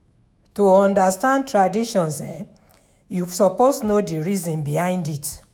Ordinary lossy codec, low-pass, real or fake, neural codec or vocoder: none; 19.8 kHz; fake; autoencoder, 48 kHz, 128 numbers a frame, DAC-VAE, trained on Japanese speech